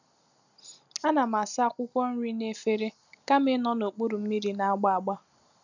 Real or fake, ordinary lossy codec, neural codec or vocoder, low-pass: real; none; none; 7.2 kHz